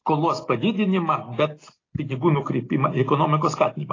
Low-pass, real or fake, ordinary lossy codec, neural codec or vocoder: 7.2 kHz; real; AAC, 32 kbps; none